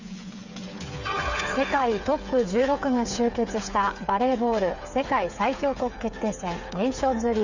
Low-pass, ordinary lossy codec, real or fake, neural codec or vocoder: 7.2 kHz; none; fake; codec, 16 kHz, 8 kbps, FreqCodec, smaller model